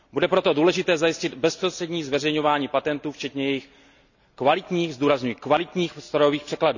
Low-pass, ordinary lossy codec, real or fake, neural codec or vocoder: 7.2 kHz; none; real; none